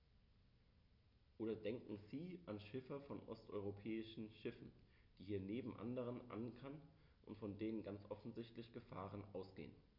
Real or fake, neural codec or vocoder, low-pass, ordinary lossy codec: real; none; 5.4 kHz; none